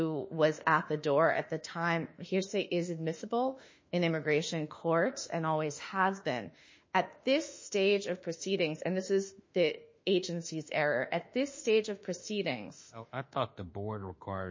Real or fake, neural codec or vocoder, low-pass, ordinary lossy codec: fake; autoencoder, 48 kHz, 32 numbers a frame, DAC-VAE, trained on Japanese speech; 7.2 kHz; MP3, 32 kbps